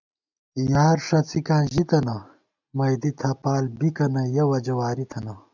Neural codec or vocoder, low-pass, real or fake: none; 7.2 kHz; real